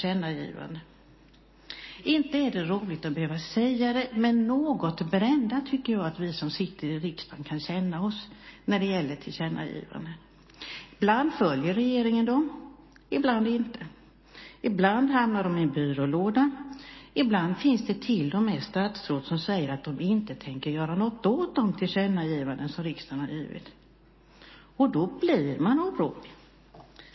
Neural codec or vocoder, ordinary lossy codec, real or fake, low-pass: none; MP3, 24 kbps; real; 7.2 kHz